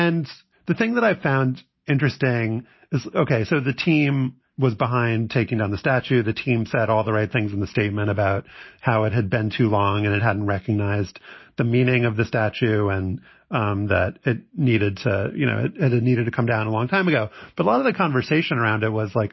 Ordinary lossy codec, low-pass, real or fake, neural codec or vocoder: MP3, 24 kbps; 7.2 kHz; real; none